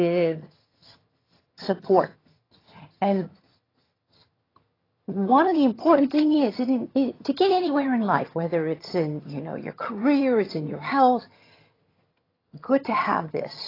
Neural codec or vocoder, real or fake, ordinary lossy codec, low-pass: vocoder, 22.05 kHz, 80 mel bands, HiFi-GAN; fake; AAC, 24 kbps; 5.4 kHz